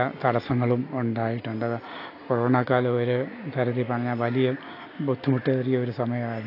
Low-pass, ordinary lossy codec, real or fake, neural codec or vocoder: 5.4 kHz; AAC, 32 kbps; real; none